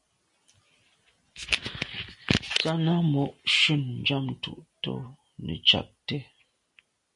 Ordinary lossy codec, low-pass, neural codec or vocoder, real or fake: MP3, 48 kbps; 10.8 kHz; none; real